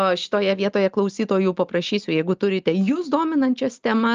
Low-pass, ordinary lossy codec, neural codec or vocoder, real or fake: 7.2 kHz; Opus, 24 kbps; none; real